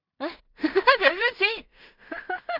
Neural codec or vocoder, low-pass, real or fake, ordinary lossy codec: codec, 16 kHz in and 24 kHz out, 0.4 kbps, LongCat-Audio-Codec, two codebook decoder; 5.4 kHz; fake; none